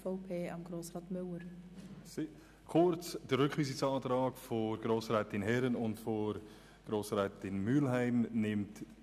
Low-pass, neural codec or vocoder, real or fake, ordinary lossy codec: 14.4 kHz; none; real; none